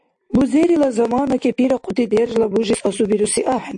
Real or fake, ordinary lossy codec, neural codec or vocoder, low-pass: real; AAC, 64 kbps; none; 9.9 kHz